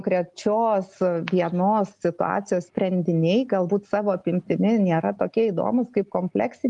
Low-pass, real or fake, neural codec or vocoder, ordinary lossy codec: 10.8 kHz; real; none; Opus, 32 kbps